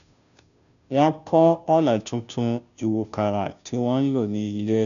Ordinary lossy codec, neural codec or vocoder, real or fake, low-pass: none; codec, 16 kHz, 0.5 kbps, FunCodec, trained on Chinese and English, 25 frames a second; fake; 7.2 kHz